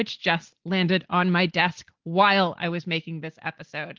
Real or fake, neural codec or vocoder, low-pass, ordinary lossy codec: real; none; 7.2 kHz; Opus, 16 kbps